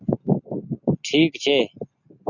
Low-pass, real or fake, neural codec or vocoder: 7.2 kHz; real; none